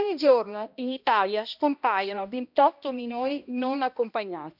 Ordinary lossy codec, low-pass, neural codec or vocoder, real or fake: none; 5.4 kHz; codec, 16 kHz, 1 kbps, X-Codec, HuBERT features, trained on balanced general audio; fake